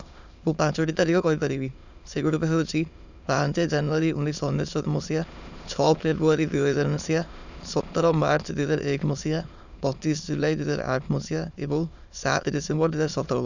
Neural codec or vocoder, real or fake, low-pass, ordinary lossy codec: autoencoder, 22.05 kHz, a latent of 192 numbers a frame, VITS, trained on many speakers; fake; 7.2 kHz; none